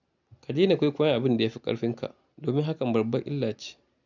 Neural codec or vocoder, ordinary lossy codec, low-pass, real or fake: none; none; 7.2 kHz; real